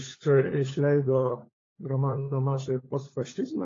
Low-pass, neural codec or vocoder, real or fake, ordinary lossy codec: 7.2 kHz; codec, 16 kHz, 4 kbps, FunCodec, trained on LibriTTS, 50 frames a second; fake; AAC, 32 kbps